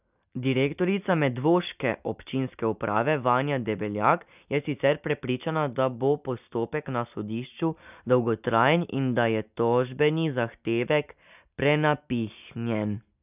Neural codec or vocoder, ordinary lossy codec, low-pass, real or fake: none; none; 3.6 kHz; real